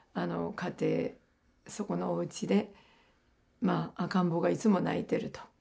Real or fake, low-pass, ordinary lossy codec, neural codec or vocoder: real; none; none; none